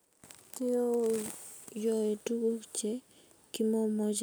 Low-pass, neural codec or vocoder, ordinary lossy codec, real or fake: none; none; none; real